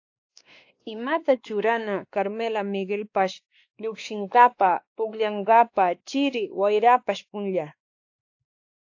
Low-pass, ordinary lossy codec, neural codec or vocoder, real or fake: 7.2 kHz; AAC, 48 kbps; codec, 16 kHz, 2 kbps, X-Codec, WavLM features, trained on Multilingual LibriSpeech; fake